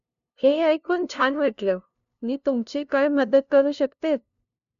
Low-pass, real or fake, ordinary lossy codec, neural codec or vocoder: 7.2 kHz; fake; none; codec, 16 kHz, 0.5 kbps, FunCodec, trained on LibriTTS, 25 frames a second